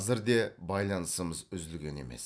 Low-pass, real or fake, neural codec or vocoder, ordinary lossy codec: none; real; none; none